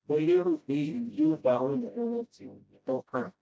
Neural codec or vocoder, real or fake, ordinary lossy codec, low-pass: codec, 16 kHz, 0.5 kbps, FreqCodec, smaller model; fake; none; none